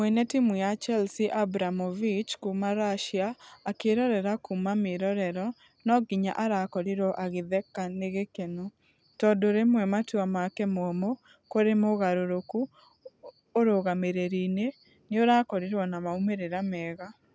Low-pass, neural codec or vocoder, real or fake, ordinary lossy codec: none; none; real; none